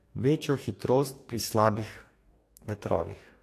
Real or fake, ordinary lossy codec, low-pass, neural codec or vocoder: fake; AAC, 64 kbps; 14.4 kHz; codec, 44.1 kHz, 2.6 kbps, DAC